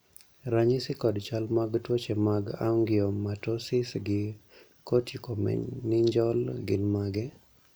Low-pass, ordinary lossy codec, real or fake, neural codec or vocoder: none; none; real; none